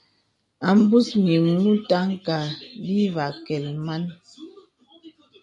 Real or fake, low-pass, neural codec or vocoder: real; 10.8 kHz; none